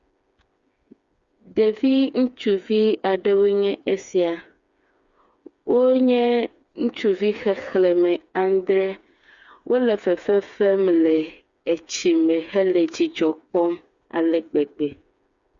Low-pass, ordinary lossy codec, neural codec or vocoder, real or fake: 7.2 kHz; Opus, 64 kbps; codec, 16 kHz, 4 kbps, FreqCodec, smaller model; fake